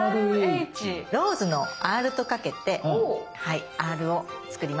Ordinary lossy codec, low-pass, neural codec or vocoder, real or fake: none; none; none; real